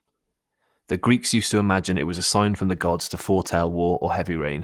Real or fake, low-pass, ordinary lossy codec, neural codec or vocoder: fake; 19.8 kHz; Opus, 24 kbps; codec, 44.1 kHz, 7.8 kbps, Pupu-Codec